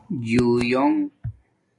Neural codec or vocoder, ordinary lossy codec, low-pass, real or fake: none; AAC, 48 kbps; 10.8 kHz; real